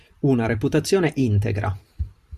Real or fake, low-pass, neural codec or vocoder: fake; 14.4 kHz; vocoder, 44.1 kHz, 128 mel bands every 512 samples, BigVGAN v2